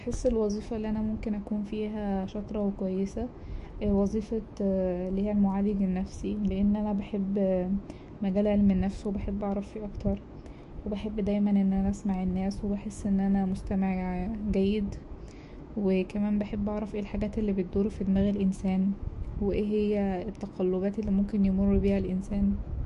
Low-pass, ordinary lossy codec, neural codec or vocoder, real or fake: 14.4 kHz; MP3, 48 kbps; autoencoder, 48 kHz, 128 numbers a frame, DAC-VAE, trained on Japanese speech; fake